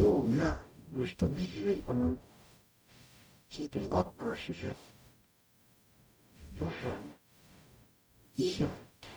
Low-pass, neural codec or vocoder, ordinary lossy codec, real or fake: none; codec, 44.1 kHz, 0.9 kbps, DAC; none; fake